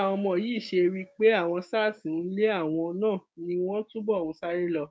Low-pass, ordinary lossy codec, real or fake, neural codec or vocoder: none; none; fake; codec, 16 kHz, 6 kbps, DAC